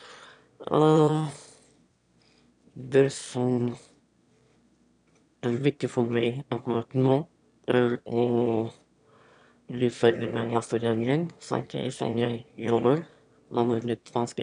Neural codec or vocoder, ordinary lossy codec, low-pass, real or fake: autoencoder, 22.05 kHz, a latent of 192 numbers a frame, VITS, trained on one speaker; none; 9.9 kHz; fake